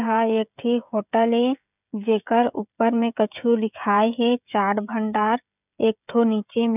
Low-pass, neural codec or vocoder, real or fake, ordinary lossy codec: 3.6 kHz; codec, 16 kHz, 16 kbps, FreqCodec, smaller model; fake; none